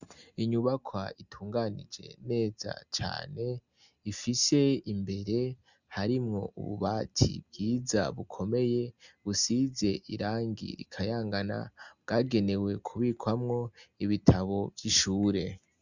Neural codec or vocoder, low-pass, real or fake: none; 7.2 kHz; real